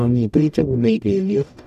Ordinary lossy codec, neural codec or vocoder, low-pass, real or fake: none; codec, 44.1 kHz, 0.9 kbps, DAC; 14.4 kHz; fake